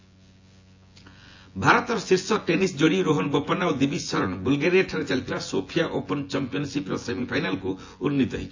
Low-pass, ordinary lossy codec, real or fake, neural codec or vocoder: 7.2 kHz; AAC, 48 kbps; fake; vocoder, 24 kHz, 100 mel bands, Vocos